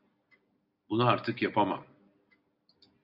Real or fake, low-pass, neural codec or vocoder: real; 5.4 kHz; none